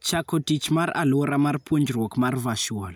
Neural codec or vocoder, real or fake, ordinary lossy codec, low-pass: none; real; none; none